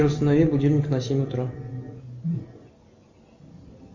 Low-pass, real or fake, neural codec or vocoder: 7.2 kHz; real; none